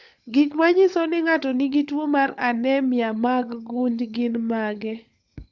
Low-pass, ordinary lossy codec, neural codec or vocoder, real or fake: 7.2 kHz; Opus, 64 kbps; codec, 16 kHz, 16 kbps, FunCodec, trained on LibriTTS, 50 frames a second; fake